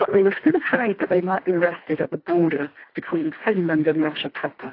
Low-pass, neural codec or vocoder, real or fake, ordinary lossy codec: 5.4 kHz; codec, 24 kHz, 1.5 kbps, HILCodec; fake; AAC, 32 kbps